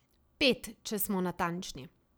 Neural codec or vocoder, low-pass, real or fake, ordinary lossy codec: vocoder, 44.1 kHz, 128 mel bands every 256 samples, BigVGAN v2; none; fake; none